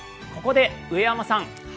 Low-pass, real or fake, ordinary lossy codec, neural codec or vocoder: none; real; none; none